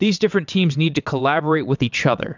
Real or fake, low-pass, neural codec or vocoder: fake; 7.2 kHz; vocoder, 22.05 kHz, 80 mel bands, WaveNeXt